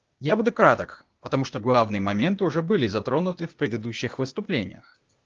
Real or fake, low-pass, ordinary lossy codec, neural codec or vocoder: fake; 7.2 kHz; Opus, 32 kbps; codec, 16 kHz, 0.8 kbps, ZipCodec